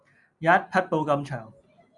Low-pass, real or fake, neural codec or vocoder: 10.8 kHz; real; none